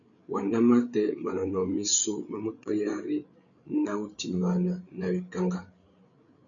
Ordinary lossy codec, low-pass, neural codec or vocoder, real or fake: MP3, 96 kbps; 7.2 kHz; codec, 16 kHz, 8 kbps, FreqCodec, larger model; fake